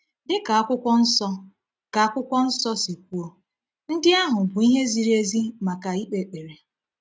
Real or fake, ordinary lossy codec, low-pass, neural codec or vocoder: real; none; none; none